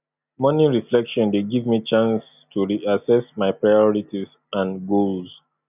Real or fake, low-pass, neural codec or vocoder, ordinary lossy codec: real; 3.6 kHz; none; none